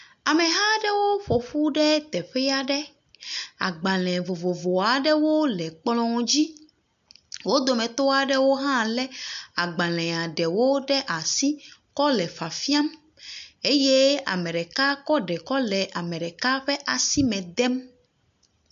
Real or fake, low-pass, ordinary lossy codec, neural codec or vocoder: real; 7.2 kHz; MP3, 64 kbps; none